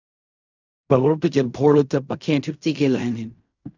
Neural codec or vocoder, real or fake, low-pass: codec, 16 kHz in and 24 kHz out, 0.4 kbps, LongCat-Audio-Codec, fine tuned four codebook decoder; fake; 7.2 kHz